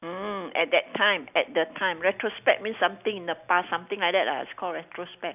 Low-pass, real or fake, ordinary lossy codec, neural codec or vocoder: 3.6 kHz; real; none; none